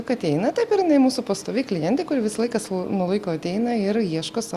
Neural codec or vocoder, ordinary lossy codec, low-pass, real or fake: none; Opus, 64 kbps; 14.4 kHz; real